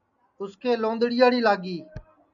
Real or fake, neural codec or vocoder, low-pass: real; none; 7.2 kHz